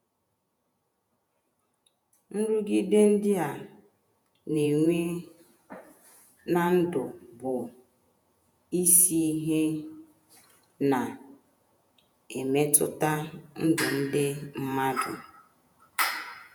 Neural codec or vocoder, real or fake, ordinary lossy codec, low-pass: vocoder, 48 kHz, 128 mel bands, Vocos; fake; none; none